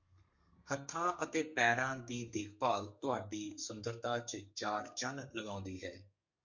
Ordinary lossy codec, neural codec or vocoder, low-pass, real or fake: MP3, 48 kbps; codec, 32 kHz, 1.9 kbps, SNAC; 7.2 kHz; fake